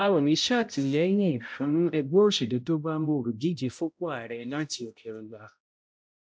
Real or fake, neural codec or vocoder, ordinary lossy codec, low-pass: fake; codec, 16 kHz, 0.5 kbps, X-Codec, HuBERT features, trained on balanced general audio; none; none